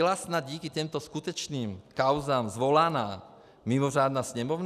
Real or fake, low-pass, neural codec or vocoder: real; 14.4 kHz; none